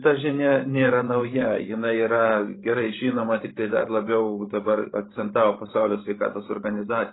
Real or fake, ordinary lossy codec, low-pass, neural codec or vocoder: fake; AAC, 16 kbps; 7.2 kHz; codec, 16 kHz, 16 kbps, FreqCodec, larger model